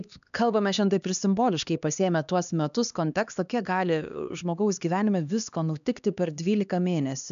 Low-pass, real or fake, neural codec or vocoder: 7.2 kHz; fake; codec, 16 kHz, 2 kbps, X-Codec, HuBERT features, trained on LibriSpeech